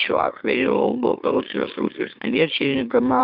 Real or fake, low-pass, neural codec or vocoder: fake; 5.4 kHz; autoencoder, 44.1 kHz, a latent of 192 numbers a frame, MeloTTS